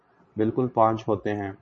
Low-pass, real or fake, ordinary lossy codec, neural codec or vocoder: 9.9 kHz; fake; MP3, 32 kbps; vocoder, 22.05 kHz, 80 mel bands, Vocos